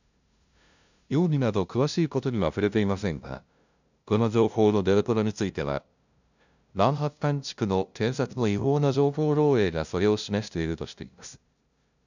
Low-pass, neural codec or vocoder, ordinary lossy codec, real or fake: 7.2 kHz; codec, 16 kHz, 0.5 kbps, FunCodec, trained on LibriTTS, 25 frames a second; none; fake